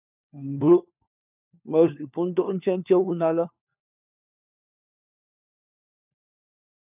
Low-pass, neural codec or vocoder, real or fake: 3.6 kHz; codec, 16 kHz, 4 kbps, FunCodec, trained on LibriTTS, 50 frames a second; fake